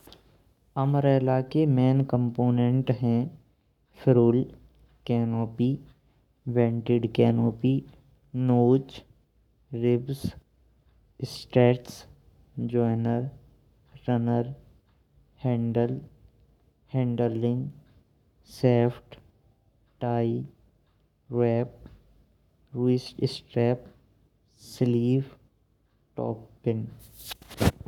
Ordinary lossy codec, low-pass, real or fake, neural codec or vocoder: none; 19.8 kHz; fake; codec, 44.1 kHz, 7.8 kbps, Pupu-Codec